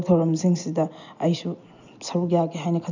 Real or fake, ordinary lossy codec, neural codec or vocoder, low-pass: real; none; none; 7.2 kHz